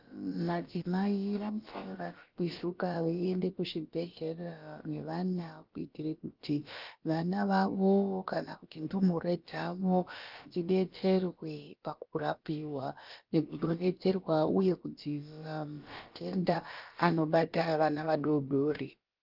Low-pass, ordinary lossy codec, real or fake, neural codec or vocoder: 5.4 kHz; Opus, 24 kbps; fake; codec, 16 kHz, about 1 kbps, DyCAST, with the encoder's durations